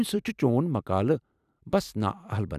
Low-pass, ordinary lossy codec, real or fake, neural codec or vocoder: 14.4 kHz; none; fake; vocoder, 44.1 kHz, 128 mel bands every 512 samples, BigVGAN v2